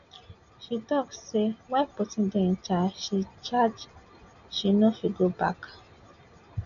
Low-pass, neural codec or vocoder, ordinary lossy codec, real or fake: 7.2 kHz; none; none; real